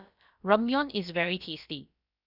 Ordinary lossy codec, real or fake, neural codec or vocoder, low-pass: none; fake; codec, 16 kHz, about 1 kbps, DyCAST, with the encoder's durations; 5.4 kHz